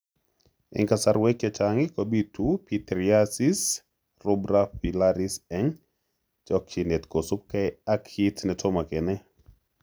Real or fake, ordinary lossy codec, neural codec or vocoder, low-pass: real; none; none; none